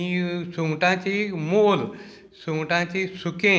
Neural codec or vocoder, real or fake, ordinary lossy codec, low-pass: none; real; none; none